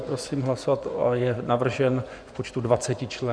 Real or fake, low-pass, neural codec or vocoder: fake; 9.9 kHz; vocoder, 24 kHz, 100 mel bands, Vocos